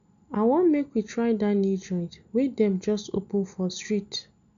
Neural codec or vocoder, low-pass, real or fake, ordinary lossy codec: none; 7.2 kHz; real; MP3, 96 kbps